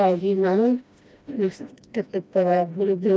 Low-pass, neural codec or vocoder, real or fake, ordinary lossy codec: none; codec, 16 kHz, 1 kbps, FreqCodec, smaller model; fake; none